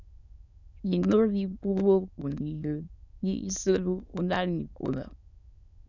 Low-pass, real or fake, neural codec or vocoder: 7.2 kHz; fake; autoencoder, 22.05 kHz, a latent of 192 numbers a frame, VITS, trained on many speakers